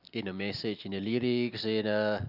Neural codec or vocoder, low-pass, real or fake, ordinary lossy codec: none; 5.4 kHz; real; none